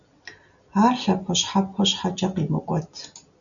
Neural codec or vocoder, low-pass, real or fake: none; 7.2 kHz; real